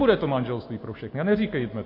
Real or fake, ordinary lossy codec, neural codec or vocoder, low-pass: real; MP3, 32 kbps; none; 5.4 kHz